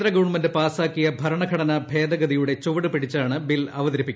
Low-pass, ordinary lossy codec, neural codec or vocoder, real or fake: none; none; none; real